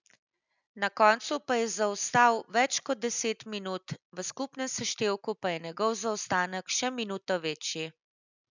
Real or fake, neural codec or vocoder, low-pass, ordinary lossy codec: real; none; 7.2 kHz; none